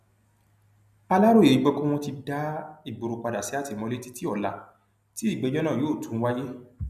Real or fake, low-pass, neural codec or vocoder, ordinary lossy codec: real; 14.4 kHz; none; none